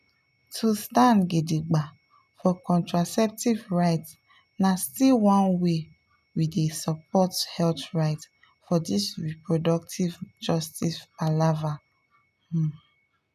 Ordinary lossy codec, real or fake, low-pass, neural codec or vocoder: none; real; 14.4 kHz; none